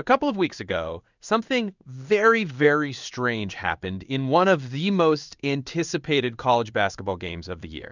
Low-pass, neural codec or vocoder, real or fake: 7.2 kHz; codec, 16 kHz in and 24 kHz out, 1 kbps, XY-Tokenizer; fake